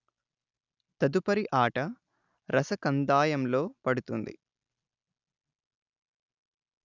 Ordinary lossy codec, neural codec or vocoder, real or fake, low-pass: none; none; real; 7.2 kHz